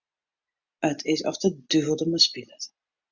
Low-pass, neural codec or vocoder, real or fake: 7.2 kHz; none; real